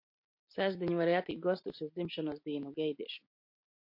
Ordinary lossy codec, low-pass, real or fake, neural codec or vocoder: MP3, 32 kbps; 5.4 kHz; real; none